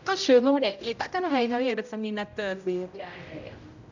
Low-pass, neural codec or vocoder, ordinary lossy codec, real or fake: 7.2 kHz; codec, 16 kHz, 0.5 kbps, X-Codec, HuBERT features, trained on general audio; none; fake